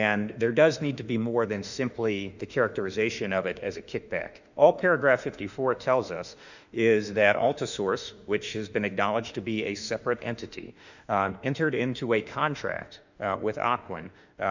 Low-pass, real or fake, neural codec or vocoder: 7.2 kHz; fake; autoencoder, 48 kHz, 32 numbers a frame, DAC-VAE, trained on Japanese speech